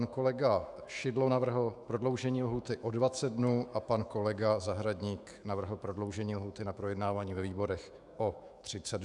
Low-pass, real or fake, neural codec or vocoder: 10.8 kHz; fake; autoencoder, 48 kHz, 128 numbers a frame, DAC-VAE, trained on Japanese speech